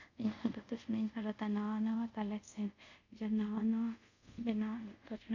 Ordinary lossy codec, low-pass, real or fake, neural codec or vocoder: none; 7.2 kHz; fake; codec, 24 kHz, 0.5 kbps, DualCodec